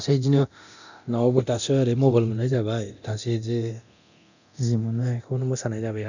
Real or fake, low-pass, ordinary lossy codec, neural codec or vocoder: fake; 7.2 kHz; none; codec, 24 kHz, 0.9 kbps, DualCodec